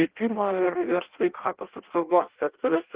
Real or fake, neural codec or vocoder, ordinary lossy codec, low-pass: fake; codec, 16 kHz in and 24 kHz out, 0.6 kbps, FireRedTTS-2 codec; Opus, 16 kbps; 3.6 kHz